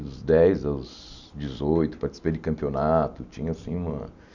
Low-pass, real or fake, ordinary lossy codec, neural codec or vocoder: 7.2 kHz; real; none; none